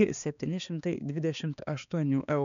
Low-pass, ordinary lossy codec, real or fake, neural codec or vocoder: 7.2 kHz; MP3, 96 kbps; fake; codec, 16 kHz, 2 kbps, X-Codec, HuBERT features, trained on balanced general audio